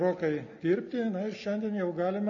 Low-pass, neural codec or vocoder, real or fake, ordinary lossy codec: 7.2 kHz; none; real; MP3, 32 kbps